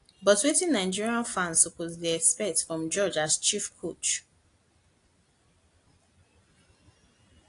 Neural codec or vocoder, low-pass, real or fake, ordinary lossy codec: none; 10.8 kHz; real; AAC, 48 kbps